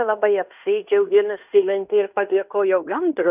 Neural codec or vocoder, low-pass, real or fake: codec, 16 kHz in and 24 kHz out, 0.9 kbps, LongCat-Audio-Codec, fine tuned four codebook decoder; 3.6 kHz; fake